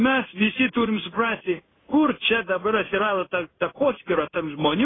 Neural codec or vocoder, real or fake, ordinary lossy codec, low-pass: codec, 16 kHz in and 24 kHz out, 1 kbps, XY-Tokenizer; fake; AAC, 16 kbps; 7.2 kHz